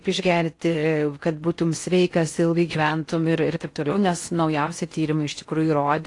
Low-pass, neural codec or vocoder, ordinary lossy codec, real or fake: 10.8 kHz; codec, 16 kHz in and 24 kHz out, 0.6 kbps, FocalCodec, streaming, 4096 codes; AAC, 48 kbps; fake